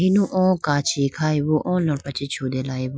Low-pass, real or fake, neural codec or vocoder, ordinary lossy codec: none; real; none; none